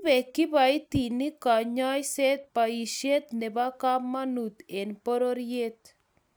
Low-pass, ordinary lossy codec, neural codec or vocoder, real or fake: none; none; none; real